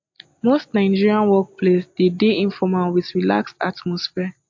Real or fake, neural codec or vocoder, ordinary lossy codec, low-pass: real; none; MP3, 48 kbps; 7.2 kHz